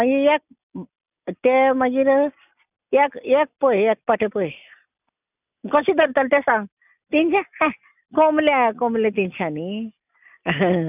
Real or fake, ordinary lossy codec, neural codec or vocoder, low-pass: real; none; none; 3.6 kHz